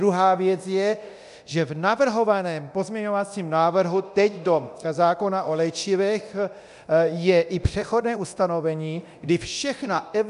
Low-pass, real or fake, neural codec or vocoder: 10.8 kHz; fake; codec, 24 kHz, 0.9 kbps, DualCodec